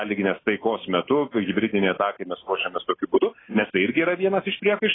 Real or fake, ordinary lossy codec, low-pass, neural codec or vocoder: real; AAC, 16 kbps; 7.2 kHz; none